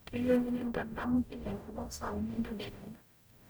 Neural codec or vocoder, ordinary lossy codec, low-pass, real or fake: codec, 44.1 kHz, 0.9 kbps, DAC; none; none; fake